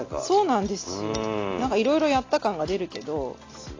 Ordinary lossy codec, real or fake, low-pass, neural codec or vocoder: AAC, 32 kbps; real; 7.2 kHz; none